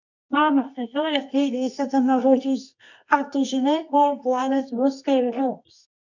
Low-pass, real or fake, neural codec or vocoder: 7.2 kHz; fake; codec, 24 kHz, 0.9 kbps, WavTokenizer, medium music audio release